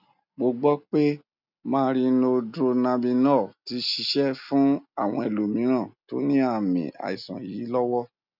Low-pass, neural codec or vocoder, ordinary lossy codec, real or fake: 5.4 kHz; none; none; real